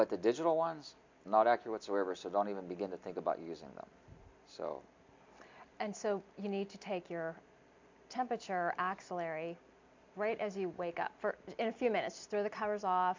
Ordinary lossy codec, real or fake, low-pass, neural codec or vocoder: MP3, 64 kbps; real; 7.2 kHz; none